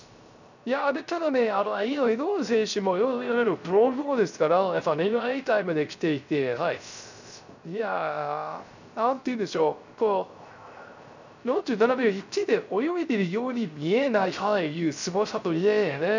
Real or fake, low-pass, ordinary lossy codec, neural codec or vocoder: fake; 7.2 kHz; none; codec, 16 kHz, 0.3 kbps, FocalCodec